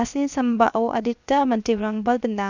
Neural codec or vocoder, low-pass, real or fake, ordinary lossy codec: codec, 16 kHz, 0.7 kbps, FocalCodec; 7.2 kHz; fake; none